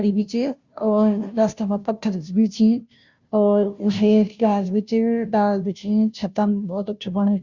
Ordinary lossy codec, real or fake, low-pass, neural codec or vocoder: Opus, 64 kbps; fake; 7.2 kHz; codec, 16 kHz, 0.5 kbps, FunCodec, trained on Chinese and English, 25 frames a second